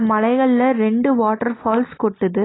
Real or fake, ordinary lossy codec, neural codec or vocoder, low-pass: real; AAC, 16 kbps; none; 7.2 kHz